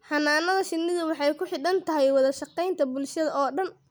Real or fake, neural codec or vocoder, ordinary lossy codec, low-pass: real; none; none; none